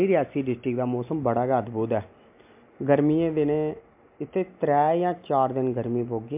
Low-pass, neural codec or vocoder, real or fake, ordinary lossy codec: 3.6 kHz; none; real; MP3, 32 kbps